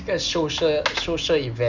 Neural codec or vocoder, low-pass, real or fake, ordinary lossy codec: none; 7.2 kHz; real; none